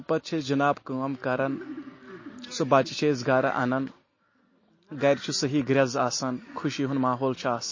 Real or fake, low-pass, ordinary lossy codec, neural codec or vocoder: real; 7.2 kHz; MP3, 32 kbps; none